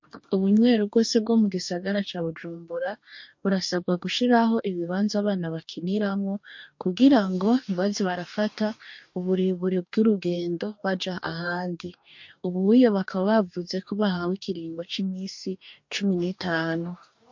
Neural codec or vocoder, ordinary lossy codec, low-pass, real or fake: codec, 44.1 kHz, 2.6 kbps, DAC; MP3, 48 kbps; 7.2 kHz; fake